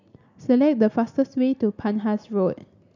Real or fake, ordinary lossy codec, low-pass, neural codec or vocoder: real; none; 7.2 kHz; none